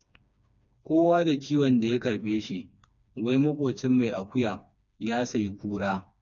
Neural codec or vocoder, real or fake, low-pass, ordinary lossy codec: codec, 16 kHz, 2 kbps, FreqCodec, smaller model; fake; 7.2 kHz; none